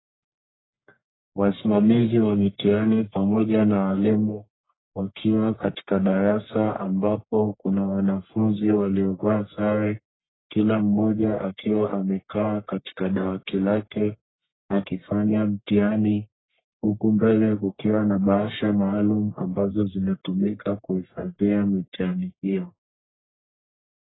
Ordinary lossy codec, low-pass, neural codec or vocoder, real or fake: AAC, 16 kbps; 7.2 kHz; codec, 44.1 kHz, 1.7 kbps, Pupu-Codec; fake